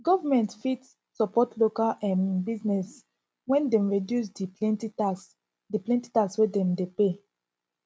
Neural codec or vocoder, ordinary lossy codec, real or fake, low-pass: none; none; real; none